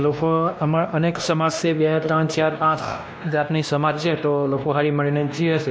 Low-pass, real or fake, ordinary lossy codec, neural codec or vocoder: none; fake; none; codec, 16 kHz, 1 kbps, X-Codec, WavLM features, trained on Multilingual LibriSpeech